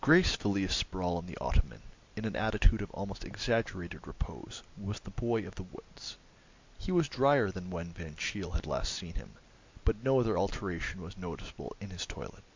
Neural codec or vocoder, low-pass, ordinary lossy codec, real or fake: none; 7.2 kHz; MP3, 64 kbps; real